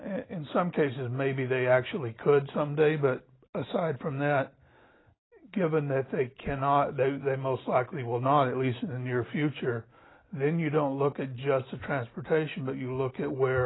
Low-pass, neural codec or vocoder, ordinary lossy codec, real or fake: 7.2 kHz; none; AAC, 16 kbps; real